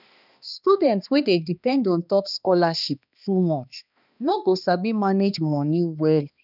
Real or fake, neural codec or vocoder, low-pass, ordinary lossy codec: fake; codec, 16 kHz, 2 kbps, X-Codec, HuBERT features, trained on balanced general audio; 5.4 kHz; none